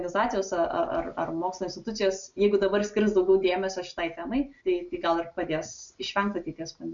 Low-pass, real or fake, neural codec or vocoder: 7.2 kHz; real; none